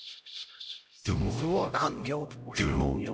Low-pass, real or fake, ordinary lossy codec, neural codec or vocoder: none; fake; none; codec, 16 kHz, 0.5 kbps, X-Codec, HuBERT features, trained on LibriSpeech